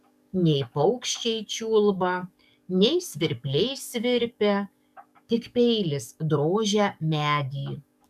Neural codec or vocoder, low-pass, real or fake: codec, 44.1 kHz, 7.8 kbps, DAC; 14.4 kHz; fake